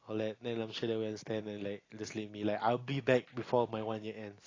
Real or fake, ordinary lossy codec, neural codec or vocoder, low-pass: real; AAC, 32 kbps; none; 7.2 kHz